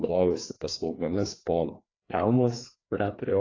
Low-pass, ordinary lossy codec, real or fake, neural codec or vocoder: 7.2 kHz; AAC, 32 kbps; fake; codec, 16 kHz, 2 kbps, FreqCodec, larger model